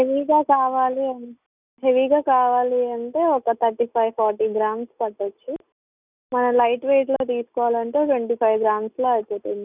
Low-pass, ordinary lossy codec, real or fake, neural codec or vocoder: 3.6 kHz; none; real; none